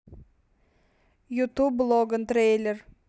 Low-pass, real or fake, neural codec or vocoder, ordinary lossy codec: none; real; none; none